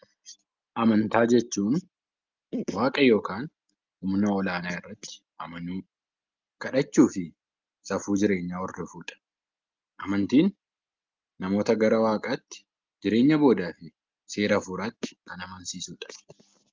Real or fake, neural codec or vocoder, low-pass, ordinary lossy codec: real; none; 7.2 kHz; Opus, 32 kbps